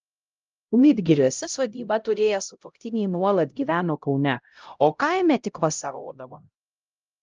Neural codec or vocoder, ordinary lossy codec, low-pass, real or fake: codec, 16 kHz, 0.5 kbps, X-Codec, HuBERT features, trained on LibriSpeech; Opus, 24 kbps; 7.2 kHz; fake